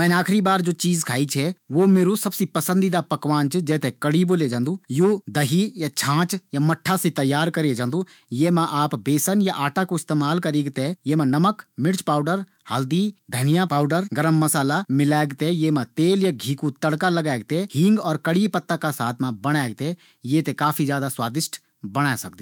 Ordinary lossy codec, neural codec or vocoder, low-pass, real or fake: none; autoencoder, 48 kHz, 128 numbers a frame, DAC-VAE, trained on Japanese speech; 19.8 kHz; fake